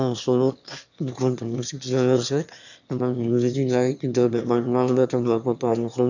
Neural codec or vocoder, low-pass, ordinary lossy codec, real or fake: autoencoder, 22.05 kHz, a latent of 192 numbers a frame, VITS, trained on one speaker; 7.2 kHz; none; fake